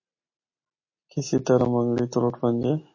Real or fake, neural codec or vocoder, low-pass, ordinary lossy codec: real; none; 7.2 kHz; MP3, 32 kbps